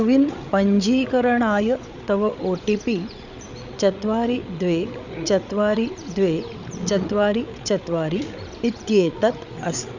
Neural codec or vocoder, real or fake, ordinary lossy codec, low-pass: codec, 16 kHz, 8 kbps, FreqCodec, larger model; fake; none; 7.2 kHz